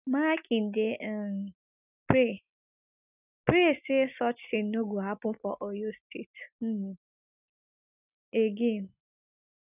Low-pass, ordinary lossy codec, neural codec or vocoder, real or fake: 3.6 kHz; none; none; real